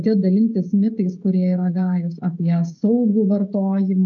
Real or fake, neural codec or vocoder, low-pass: fake; codec, 16 kHz, 16 kbps, FreqCodec, smaller model; 7.2 kHz